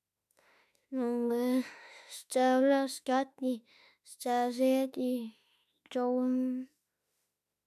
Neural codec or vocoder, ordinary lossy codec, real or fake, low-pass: autoencoder, 48 kHz, 32 numbers a frame, DAC-VAE, trained on Japanese speech; none; fake; 14.4 kHz